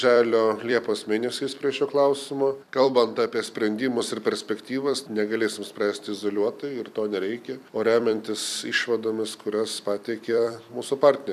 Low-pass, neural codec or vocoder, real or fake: 14.4 kHz; vocoder, 44.1 kHz, 128 mel bands every 256 samples, BigVGAN v2; fake